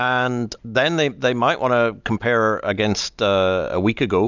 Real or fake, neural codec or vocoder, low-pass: real; none; 7.2 kHz